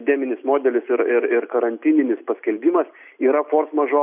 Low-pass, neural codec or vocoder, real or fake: 3.6 kHz; none; real